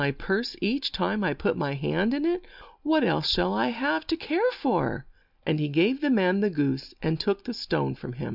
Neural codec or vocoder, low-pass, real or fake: none; 5.4 kHz; real